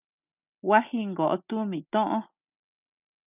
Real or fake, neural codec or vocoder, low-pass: real; none; 3.6 kHz